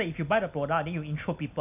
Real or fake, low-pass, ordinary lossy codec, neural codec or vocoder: real; 3.6 kHz; none; none